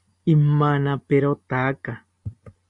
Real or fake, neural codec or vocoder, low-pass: real; none; 10.8 kHz